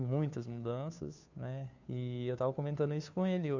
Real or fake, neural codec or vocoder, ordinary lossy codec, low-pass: fake; codec, 16 kHz, 2 kbps, FunCodec, trained on Chinese and English, 25 frames a second; none; 7.2 kHz